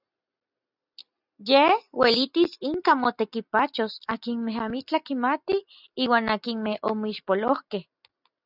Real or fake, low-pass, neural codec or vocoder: real; 5.4 kHz; none